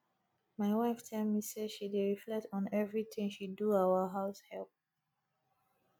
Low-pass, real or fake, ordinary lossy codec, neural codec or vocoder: 19.8 kHz; real; none; none